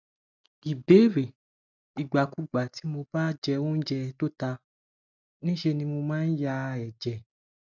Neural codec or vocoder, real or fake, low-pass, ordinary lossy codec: none; real; 7.2 kHz; none